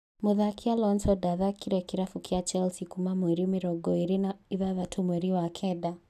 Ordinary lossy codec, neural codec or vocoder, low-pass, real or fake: none; none; 14.4 kHz; real